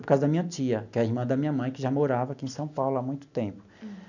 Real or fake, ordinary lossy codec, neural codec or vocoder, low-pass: real; none; none; 7.2 kHz